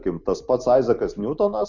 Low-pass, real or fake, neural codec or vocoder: 7.2 kHz; real; none